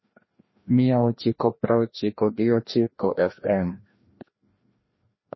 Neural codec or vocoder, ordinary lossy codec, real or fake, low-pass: codec, 16 kHz, 1 kbps, FreqCodec, larger model; MP3, 24 kbps; fake; 7.2 kHz